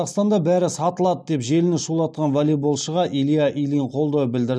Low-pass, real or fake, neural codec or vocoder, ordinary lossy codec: none; real; none; none